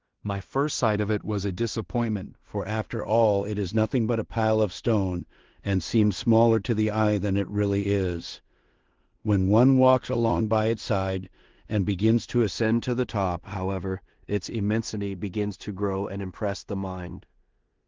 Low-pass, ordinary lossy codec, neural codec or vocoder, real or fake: 7.2 kHz; Opus, 16 kbps; codec, 16 kHz in and 24 kHz out, 0.4 kbps, LongCat-Audio-Codec, two codebook decoder; fake